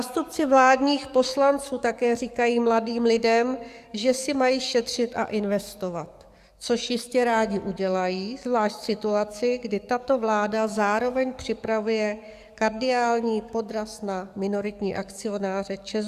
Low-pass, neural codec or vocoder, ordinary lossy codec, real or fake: 14.4 kHz; codec, 44.1 kHz, 7.8 kbps, DAC; Opus, 64 kbps; fake